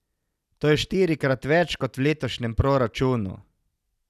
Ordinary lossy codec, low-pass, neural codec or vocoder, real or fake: none; 14.4 kHz; none; real